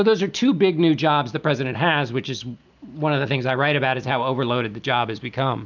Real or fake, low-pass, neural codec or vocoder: real; 7.2 kHz; none